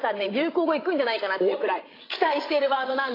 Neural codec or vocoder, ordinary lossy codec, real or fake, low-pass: codec, 16 kHz, 16 kbps, FreqCodec, larger model; AAC, 24 kbps; fake; 5.4 kHz